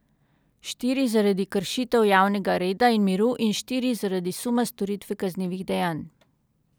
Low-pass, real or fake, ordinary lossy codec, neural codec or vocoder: none; real; none; none